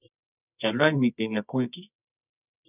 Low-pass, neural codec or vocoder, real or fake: 3.6 kHz; codec, 24 kHz, 0.9 kbps, WavTokenizer, medium music audio release; fake